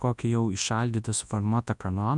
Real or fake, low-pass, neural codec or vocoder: fake; 10.8 kHz; codec, 24 kHz, 0.9 kbps, WavTokenizer, large speech release